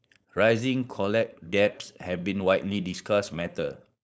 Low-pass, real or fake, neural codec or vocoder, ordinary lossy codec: none; fake; codec, 16 kHz, 4.8 kbps, FACodec; none